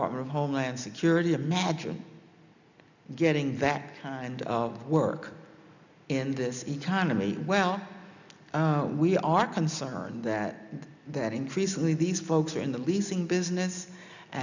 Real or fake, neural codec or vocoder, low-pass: real; none; 7.2 kHz